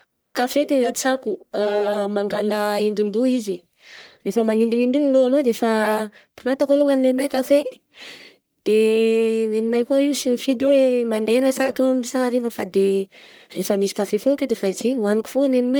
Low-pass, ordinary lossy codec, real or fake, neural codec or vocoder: none; none; fake; codec, 44.1 kHz, 1.7 kbps, Pupu-Codec